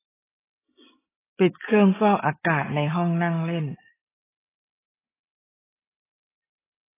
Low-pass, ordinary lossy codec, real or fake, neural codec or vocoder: 3.6 kHz; AAC, 16 kbps; real; none